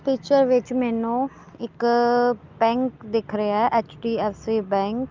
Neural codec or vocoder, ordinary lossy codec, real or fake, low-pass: none; Opus, 32 kbps; real; 7.2 kHz